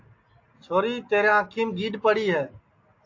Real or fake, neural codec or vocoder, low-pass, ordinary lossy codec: real; none; 7.2 kHz; AAC, 48 kbps